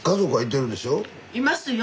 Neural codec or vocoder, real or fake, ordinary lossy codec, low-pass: none; real; none; none